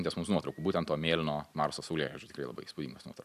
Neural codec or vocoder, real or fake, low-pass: none; real; 14.4 kHz